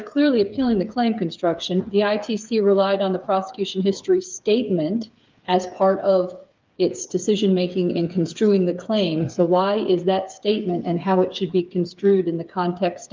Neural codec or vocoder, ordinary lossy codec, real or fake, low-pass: codec, 16 kHz, 8 kbps, FreqCodec, smaller model; Opus, 24 kbps; fake; 7.2 kHz